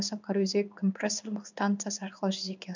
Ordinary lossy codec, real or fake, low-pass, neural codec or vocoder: none; fake; 7.2 kHz; codec, 16 kHz in and 24 kHz out, 1 kbps, XY-Tokenizer